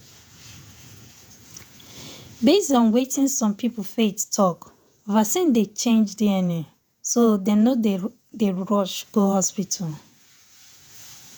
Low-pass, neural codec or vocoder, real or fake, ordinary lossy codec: none; vocoder, 48 kHz, 128 mel bands, Vocos; fake; none